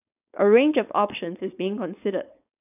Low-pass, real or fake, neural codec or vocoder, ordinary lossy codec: 3.6 kHz; fake; codec, 16 kHz, 4.8 kbps, FACodec; none